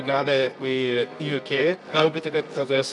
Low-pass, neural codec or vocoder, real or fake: 10.8 kHz; codec, 24 kHz, 0.9 kbps, WavTokenizer, medium music audio release; fake